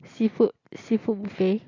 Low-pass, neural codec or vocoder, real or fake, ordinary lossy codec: 7.2 kHz; none; real; AAC, 32 kbps